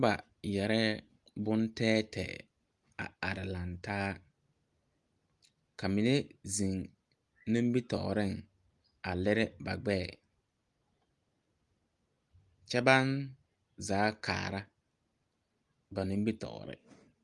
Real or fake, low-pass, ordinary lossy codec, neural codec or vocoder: real; 10.8 kHz; Opus, 32 kbps; none